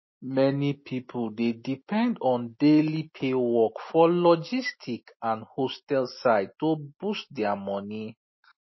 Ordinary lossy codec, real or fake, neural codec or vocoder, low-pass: MP3, 24 kbps; real; none; 7.2 kHz